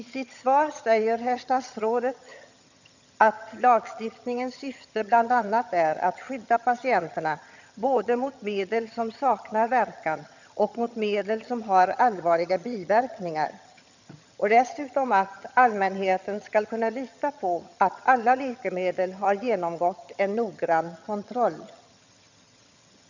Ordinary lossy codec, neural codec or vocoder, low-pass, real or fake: none; vocoder, 22.05 kHz, 80 mel bands, HiFi-GAN; 7.2 kHz; fake